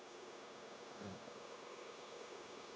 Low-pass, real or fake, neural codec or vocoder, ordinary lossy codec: none; fake; codec, 16 kHz, 0.9 kbps, LongCat-Audio-Codec; none